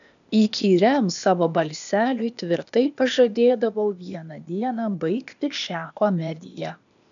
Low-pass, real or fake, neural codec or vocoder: 7.2 kHz; fake; codec, 16 kHz, 0.8 kbps, ZipCodec